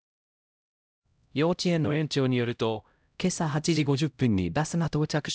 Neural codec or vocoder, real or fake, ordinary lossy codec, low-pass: codec, 16 kHz, 0.5 kbps, X-Codec, HuBERT features, trained on LibriSpeech; fake; none; none